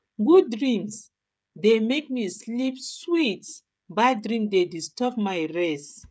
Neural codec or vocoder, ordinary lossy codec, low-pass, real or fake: codec, 16 kHz, 16 kbps, FreqCodec, smaller model; none; none; fake